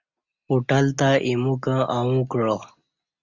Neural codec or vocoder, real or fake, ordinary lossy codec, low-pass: none; real; Opus, 64 kbps; 7.2 kHz